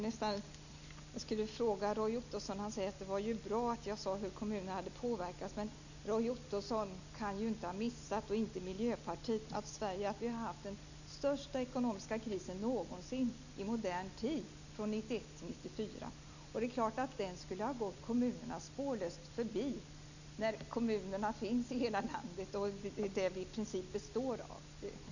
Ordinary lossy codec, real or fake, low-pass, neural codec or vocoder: none; real; 7.2 kHz; none